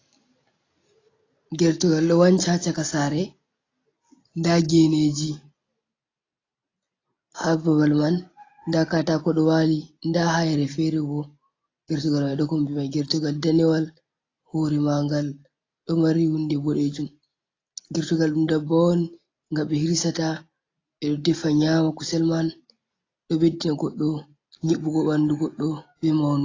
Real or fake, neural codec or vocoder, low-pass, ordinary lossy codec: real; none; 7.2 kHz; AAC, 32 kbps